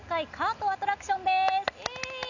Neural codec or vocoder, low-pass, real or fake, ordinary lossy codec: none; 7.2 kHz; real; none